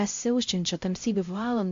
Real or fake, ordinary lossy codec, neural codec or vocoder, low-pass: fake; AAC, 48 kbps; codec, 16 kHz, 0.5 kbps, X-Codec, HuBERT features, trained on LibriSpeech; 7.2 kHz